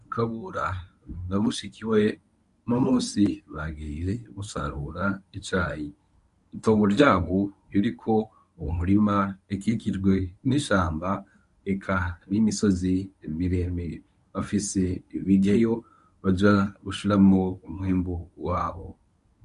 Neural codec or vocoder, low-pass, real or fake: codec, 24 kHz, 0.9 kbps, WavTokenizer, medium speech release version 1; 10.8 kHz; fake